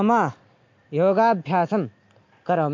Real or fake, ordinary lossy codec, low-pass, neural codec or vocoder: real; MP3, 48 kbps; 7.2 kHz; none